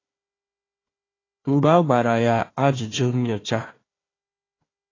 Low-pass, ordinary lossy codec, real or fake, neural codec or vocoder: 7.2 kHz; AAC, 32 kbps; fake; codec, 16 kHz, 1 kbps, FunCodec, trained on Chinese and English, 50 frames a second